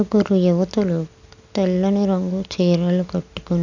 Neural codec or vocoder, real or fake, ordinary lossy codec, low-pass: none; real; none; 7.2 kHz